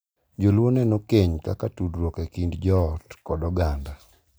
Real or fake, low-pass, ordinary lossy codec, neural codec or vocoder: real; none; none; none